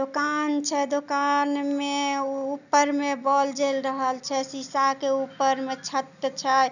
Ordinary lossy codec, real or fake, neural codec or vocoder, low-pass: none; real; none; 7.2 kHz